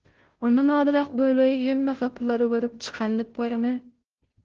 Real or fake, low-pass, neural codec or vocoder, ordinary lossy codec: fake; 7.2 kHz; codec, 16 kHz, 0.5 kbps, FunCodec, trained on Chinese and English, 25 frames a second; Opus, 16 kbps